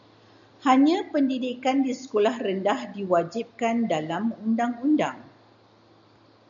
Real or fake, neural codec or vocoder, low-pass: real; none; 7.2 kHz